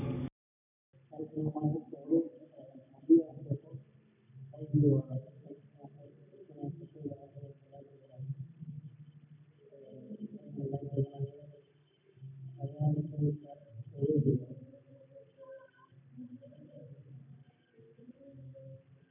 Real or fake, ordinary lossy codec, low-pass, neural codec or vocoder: real; none; 3.6 kHz; none